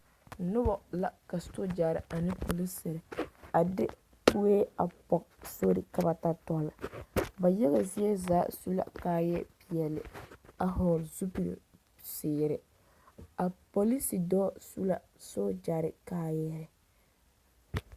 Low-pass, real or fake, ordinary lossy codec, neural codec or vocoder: 14.4 kHz; real; Opus, 64 kbps; none